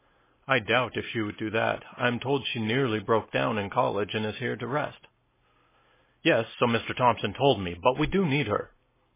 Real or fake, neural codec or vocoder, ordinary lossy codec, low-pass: real; none; MP3, 16 kbps; 3.6 kHz